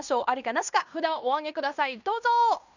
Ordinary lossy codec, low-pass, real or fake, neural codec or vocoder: none; 7.2 kHz; fake; codec, 16 kHz in and 24 kHz out, 0.9 kbps, LongCat-Audio-Codec, fine tuned four codebook decoder